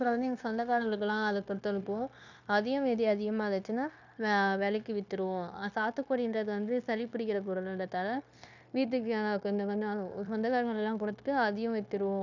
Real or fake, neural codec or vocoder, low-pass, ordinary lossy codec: fake; codec, 16 kHz, 0.9 kbps, LongCat-Audio-Codec; 7.2 kHz; none